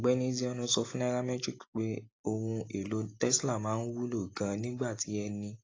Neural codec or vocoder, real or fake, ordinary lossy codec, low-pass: none; real; AAC, 32 kbps; 7.2 kHz